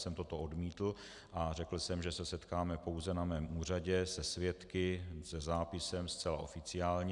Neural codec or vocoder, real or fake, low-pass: none; real; 10.8 kHz